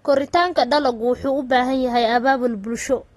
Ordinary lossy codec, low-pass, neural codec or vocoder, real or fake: AAC, 32 kbps; 19.8 kHz; none; real